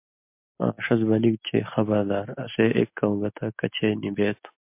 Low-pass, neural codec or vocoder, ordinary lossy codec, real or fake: 3.6 kHz; none; MP3, 32 kbps; real